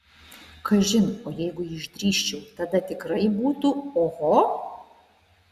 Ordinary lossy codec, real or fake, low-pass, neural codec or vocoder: Opus, 64 kbps; real; 14.4 kHz; none